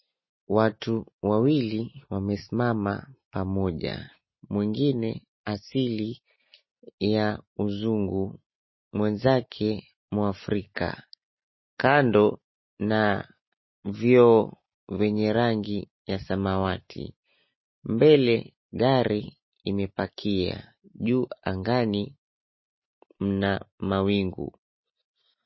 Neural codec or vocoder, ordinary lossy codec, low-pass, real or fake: none; MP3, 24 kbps; 7.2 kHz; real